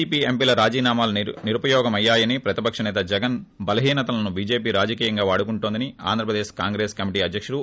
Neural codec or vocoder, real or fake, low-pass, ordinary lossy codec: none; real; none; none